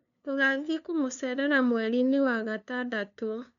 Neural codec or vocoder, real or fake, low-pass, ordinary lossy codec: codec, 16 kHz, 2 kbps, FunCodec, trained on LibriTTS, 25 frames a second; fake; 7.2 kHz; none